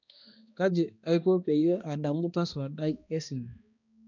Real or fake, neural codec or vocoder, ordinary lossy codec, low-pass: fake; codec, 16 kHz, 1 kbps, X-Codec, HuBERT features, trained on balanced general audio; none; 7.2 kHz